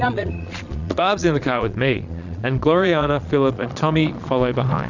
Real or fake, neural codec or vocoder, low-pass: fake; vocoder, 22.05 kHz, 80 mel bands, Vocos; 7.2 kHz